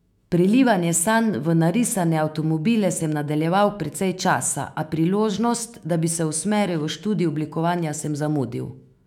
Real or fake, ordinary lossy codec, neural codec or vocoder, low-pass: fake; none; autoencoder, 48 kHz, 128 numbers a frame, DAC-VAE, trained on Japanese speech; 19.8 kHz